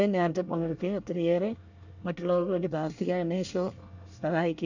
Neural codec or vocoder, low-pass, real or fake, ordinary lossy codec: codec, 24 kHz, 1 kbps, SNAC; 7.2 kHz; fake; none